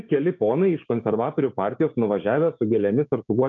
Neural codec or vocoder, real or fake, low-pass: codec, 16 kHz, 6 kbps, DAC; fake; 7.2 kHz